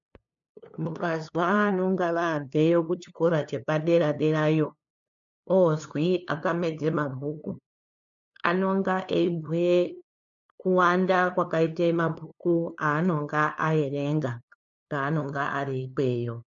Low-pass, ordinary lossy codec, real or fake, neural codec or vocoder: 7.2 kHz; MP3, 64 kbps; fake; codec, 16 kHz, 2 kbps, FunCodec, trained on LibriTTS, 25 frames a second